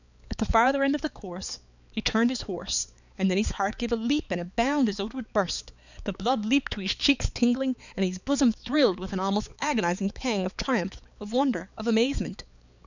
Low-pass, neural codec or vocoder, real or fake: 7.2 kHz; codec, 16 kHz, 4 kbps, X-Codec, HuBERT features, trained on balanced general audio; fake